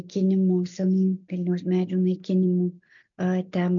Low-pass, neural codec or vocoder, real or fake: 7.2 kHz; none; real